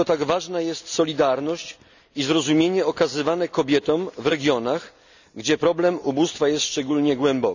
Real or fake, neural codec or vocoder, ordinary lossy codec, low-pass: real; none; none; 7.2 kHz